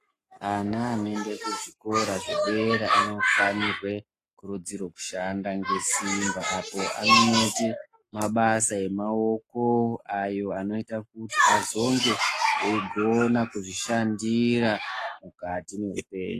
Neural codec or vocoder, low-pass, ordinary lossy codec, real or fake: codec, 44.1 kHz, 7.8 kbps, Pupu-Codec; 14.4 kHz; AAC, 48 kbps; fake